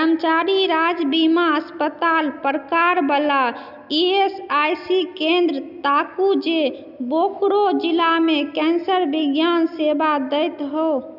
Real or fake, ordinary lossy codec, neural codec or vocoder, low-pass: real; none; none; 5.4 kHz